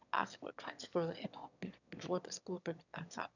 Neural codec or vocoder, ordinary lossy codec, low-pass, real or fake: autoencoder, 22.05 kHz, a latent of 192 numbers a frame, VITS, trained on one speaker; none; 7.2 kHz; fake